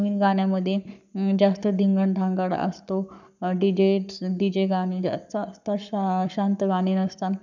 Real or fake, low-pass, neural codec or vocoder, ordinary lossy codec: fake; 7.2 kHz; codec, 44.1 kHz, 7.8 kbps, Pupu-Codec; none